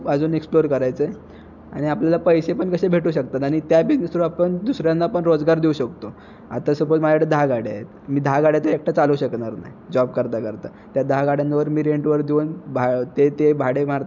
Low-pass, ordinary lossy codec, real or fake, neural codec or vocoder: 7.2 kHz; none; real; none